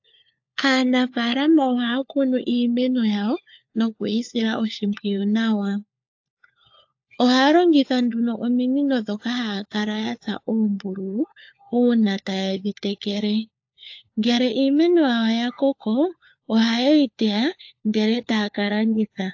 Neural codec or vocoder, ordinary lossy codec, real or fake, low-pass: codec, 16 kHz, 4 kbps, FunCodec, trained on LibriTTS, 50 frames a second; AAC, 48 kbps; fake; 7.2 kHz